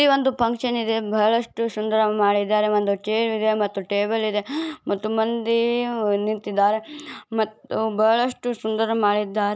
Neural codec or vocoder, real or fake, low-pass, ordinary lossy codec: none; real; none; none